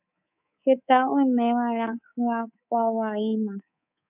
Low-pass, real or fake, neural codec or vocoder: 3.6 kHz; fake; codec, 24 kHz, 3.1 kbps, DualCodec